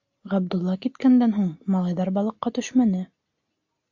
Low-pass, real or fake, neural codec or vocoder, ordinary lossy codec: 7.2 kHz; real; none; AAC, 48 kbps